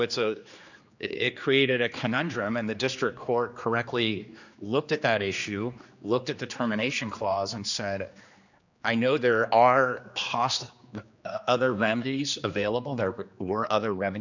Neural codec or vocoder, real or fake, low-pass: codec, 16 kHz, 2 kbps, X-Codec, HuBERT features, trained on general audio; fake; 7.2 kHz